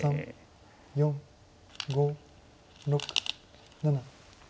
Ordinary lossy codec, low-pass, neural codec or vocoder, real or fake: none; none; none; real